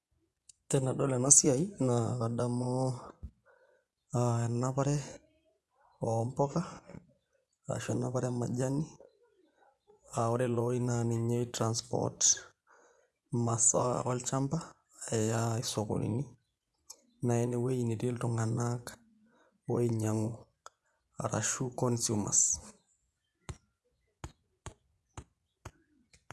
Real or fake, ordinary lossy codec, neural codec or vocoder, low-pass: fake; none; vocoder, 24 kHz, 100 mel bands, Vocos; none